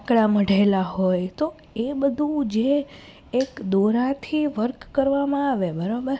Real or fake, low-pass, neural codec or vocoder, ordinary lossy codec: real; none; none; none